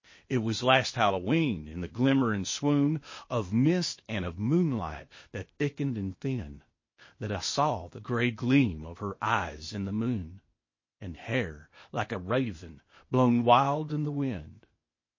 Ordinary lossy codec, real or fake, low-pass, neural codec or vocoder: MP3, 32 kbps; fake; 7.2 kHz; codec, 16 kHz, 0.8 kbps, ZipCodec